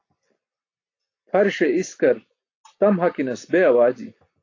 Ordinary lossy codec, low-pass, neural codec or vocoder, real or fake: AAC, 48 kbps; 7.2 kHz; none; real